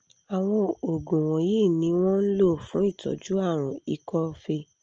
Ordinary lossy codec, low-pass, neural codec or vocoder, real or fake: Opus, 24 kbps; 7.2 kHz; none; real